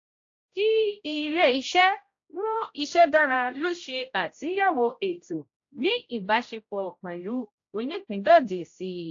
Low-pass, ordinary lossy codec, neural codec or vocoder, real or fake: 7.2 kHz; AAC, 48 kbps; codec, 16 kHz, 0.5 kbps, X-Codec, HuBERT features, trained on general audio; fake